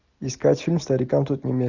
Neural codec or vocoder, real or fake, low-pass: none; real; 7.2 kHz